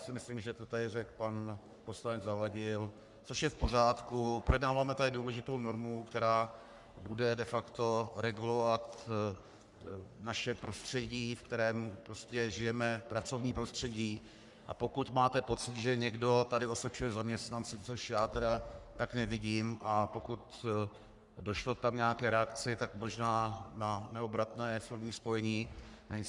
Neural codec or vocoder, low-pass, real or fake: codec, 44.1 kHz, 3.4 kbps, Pupu-Codec; 10.8 kHz; fake